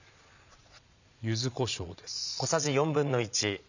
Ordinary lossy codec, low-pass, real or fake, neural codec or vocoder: none; 7.2 kHz; fake; vocoder, 22.05 kHz, 80 mel bands, Vocos